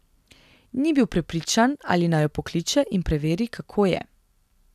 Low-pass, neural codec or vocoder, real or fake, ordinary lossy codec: 14.4 kHz; none; real; none